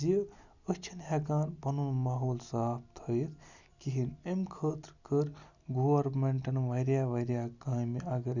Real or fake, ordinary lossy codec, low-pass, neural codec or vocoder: real; none; 7.2 kHz; none